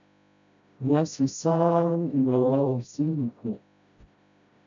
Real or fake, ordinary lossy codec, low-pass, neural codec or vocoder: fake; MP3, 64 kbps; 7.2 kHz; codec, 16 kHz, 0.5 kbps, FreqCodec, smaller model